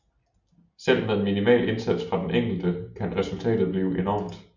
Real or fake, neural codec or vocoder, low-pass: real; none; 7.2 kHz